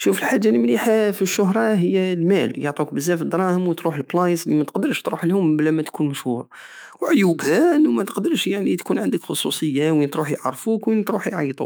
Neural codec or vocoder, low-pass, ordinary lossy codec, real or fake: autoencoder, 48 kHz, 128 numbers a frame, DAC-VAE, trained on Japanese speech; none; none; fake